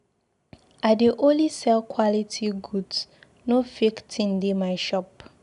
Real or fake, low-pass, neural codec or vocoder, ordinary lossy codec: real; 10.8 kHz; none; none